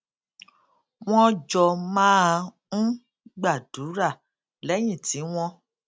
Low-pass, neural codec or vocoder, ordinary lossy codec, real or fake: none; none; none; real